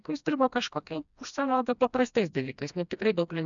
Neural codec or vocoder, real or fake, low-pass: codec, 16 kHz, 1 kbps, FreqCodec, smaller model; fake; 7.2 kHz